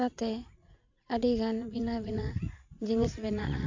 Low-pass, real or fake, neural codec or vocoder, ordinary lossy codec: 7.2 kHz; fake; vocoder, 44.1 kHz, 80 mel bands, Vocos; AAC, 48 kbps